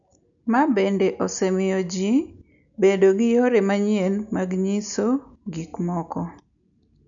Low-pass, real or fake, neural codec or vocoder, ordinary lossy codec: 7.2 kHz; real; none; none